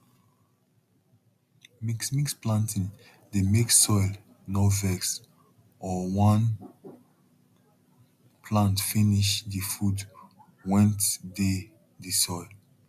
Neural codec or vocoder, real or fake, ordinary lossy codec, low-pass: none; real; MP3, 96 kbps; 14.4 kHz